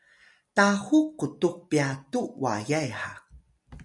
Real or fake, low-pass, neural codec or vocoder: real; 10.8 kHz; none